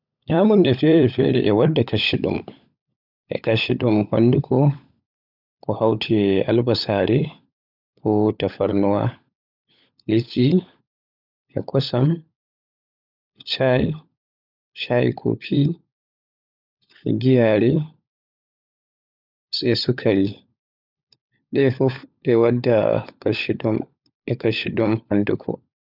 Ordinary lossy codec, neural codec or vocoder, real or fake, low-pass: none; codec, 16 kHz, 16 kbps, FunCodec, trained on LibriTTS, 50 frames a second; fake; 5.4 kHz